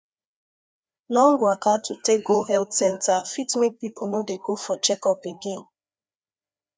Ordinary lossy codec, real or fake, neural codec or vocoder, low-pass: none; fake; codec, 16 kHz, 2 kbps, FreqCodec, larger model; none